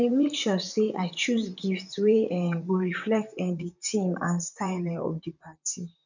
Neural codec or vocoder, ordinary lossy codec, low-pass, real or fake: vocoder, 44.1 kHz, 128 mel bands, Pupu-Vocoder; none; 7.2 kHz; fake